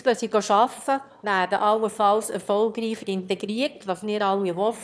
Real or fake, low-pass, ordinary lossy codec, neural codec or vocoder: fake; none; none; autoencoder, 22.05 kHz, a latent of 192 numbers a frame, VITS, trained on one speaker